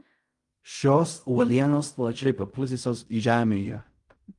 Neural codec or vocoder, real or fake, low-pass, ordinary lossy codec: codec, 16 kHz in and 24 kHz out, 0.4 kbps, LongCat-Audio-Codec, fine tuned four codebook decoder; fake; 10.8 kHz; Opus, 32 kbps